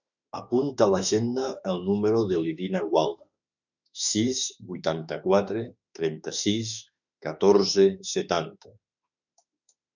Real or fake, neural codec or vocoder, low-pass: fake; autoencoder, 48 kHz, 32 numbers a frame, DAC-VAE, trained on Japanese speech; 7.2 kHz